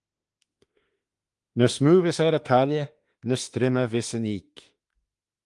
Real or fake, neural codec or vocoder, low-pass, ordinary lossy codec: fake; autoencoder, 48 kHz, 32 numbers a frame, DAC-VAE, trained on Japanese speech; 10.8 kHz; Opus, 24 kbps